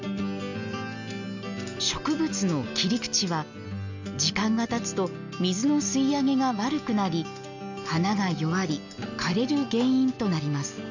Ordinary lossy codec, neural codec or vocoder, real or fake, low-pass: none; none; real; 7.2 kHz